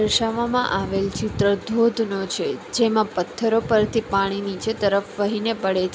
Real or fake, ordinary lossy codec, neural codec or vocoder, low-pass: real; none; none; none